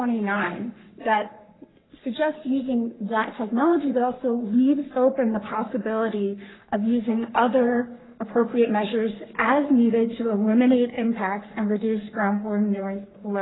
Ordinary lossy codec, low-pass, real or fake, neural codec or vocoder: AAC, 16 kbps; 7.2 kHz; fake; codec, 44.1 kHz, 3.4 kbps, Pupu-Codec